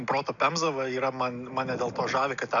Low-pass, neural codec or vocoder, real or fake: 7.2 kHz; none; real